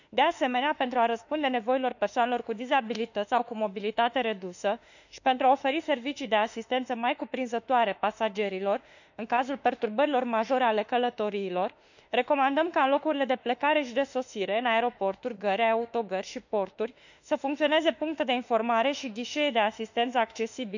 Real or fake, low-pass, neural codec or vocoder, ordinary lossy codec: fake; 7.2 kHz; autoencoder, 48 kHz, 32 numbers a frame, DAC-VAE, trained on Japanese speech; none